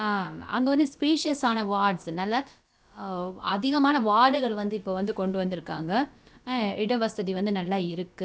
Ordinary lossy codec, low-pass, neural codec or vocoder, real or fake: none; none; codec, 16 kHz, about 1 kbps, DyCAST, with the encoder's durations; fake